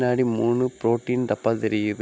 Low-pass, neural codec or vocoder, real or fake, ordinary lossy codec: none; none; real; none